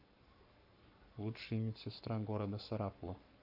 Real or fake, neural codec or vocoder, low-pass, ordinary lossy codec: fake; codec, 44.1 kHz, 7.8 kbps, Pupu-Codec; 5.4 kHz; none